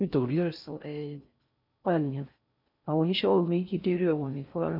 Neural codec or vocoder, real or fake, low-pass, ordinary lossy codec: codec, 16 kHz in and 24 kHz out, 0.6 kbps, FocalCodec, streaming, 4096 codes; fake; 5.4 kHz; none